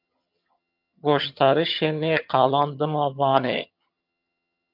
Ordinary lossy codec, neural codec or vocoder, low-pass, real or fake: MP3, 48 kbps; vocoder, 22.05 kHz, 80 mel bands, HiFi-GAN; 5.4 kHz; fake